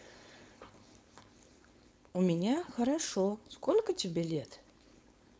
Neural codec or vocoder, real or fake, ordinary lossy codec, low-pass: codec, 16 kHz, 4.8 kbps, FACodec; fake; none; none